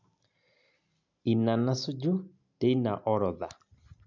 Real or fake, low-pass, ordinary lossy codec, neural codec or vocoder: real; 7.2 kHz; MP3, 64 kbps; none